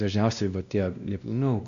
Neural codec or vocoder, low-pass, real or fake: codec, 16 kHz, 1 kbps, X-Codec, WavLM features, trained on Multilingual LibriSpeech; 7.2 kHz; fake